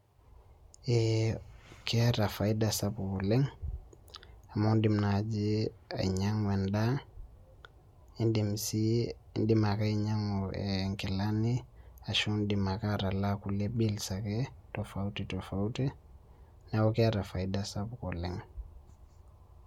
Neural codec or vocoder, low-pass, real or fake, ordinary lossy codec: none; 19.8 kHz; real; MP3, 96 kbps